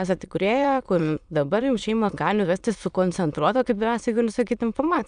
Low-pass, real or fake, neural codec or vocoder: 9.9 kHz; fake; autoencoder, 22.05 kHz, a latent of 192 numbers a frame, VITS, trained on many speakers